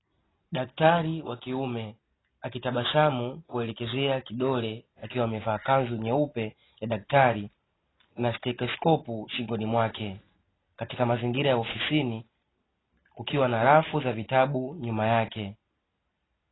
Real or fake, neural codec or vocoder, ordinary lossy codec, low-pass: real; none; AAC, 16 kbps; 7.2 kHz